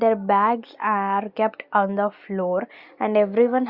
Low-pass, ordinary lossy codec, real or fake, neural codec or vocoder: 5.4 kHz; Opus, 64 kbps; real; none